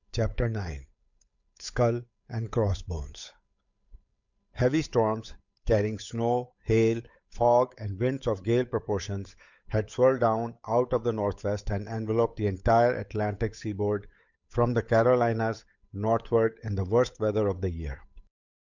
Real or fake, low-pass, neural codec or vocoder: fake; 7.2 kHz; codec, 16 kHz, 8 kbps, FunCodec, trained on Chinese and English, 25 frames a second